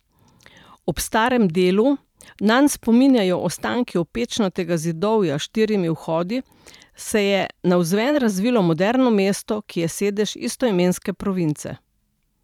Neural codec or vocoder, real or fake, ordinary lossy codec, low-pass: vocoder, 44.1 kHz, 128 mel bands every 512 samples, BigVGAN v2; fake; none; 19.8 kHz